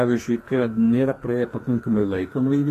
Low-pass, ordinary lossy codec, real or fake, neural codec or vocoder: 14.4 kHz; AAC, 48 kbps; fake; codec, 44.1 kHz, 2.6 kbps, SNAC